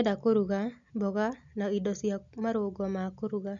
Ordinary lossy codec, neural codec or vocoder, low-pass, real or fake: none; none; 7.2 kHz; real